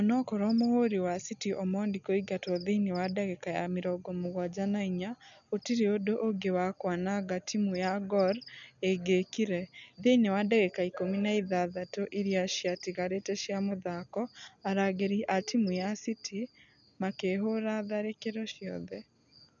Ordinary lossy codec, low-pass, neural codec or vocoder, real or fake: none; 7.2 kHz; none; real